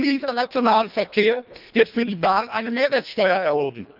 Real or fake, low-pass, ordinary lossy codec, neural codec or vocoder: fake; 5.4 kHz; none; codec, 24 kHz, 1.5 kbps, HILCodec